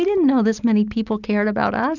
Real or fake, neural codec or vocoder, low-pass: real; none; 7.2 kHz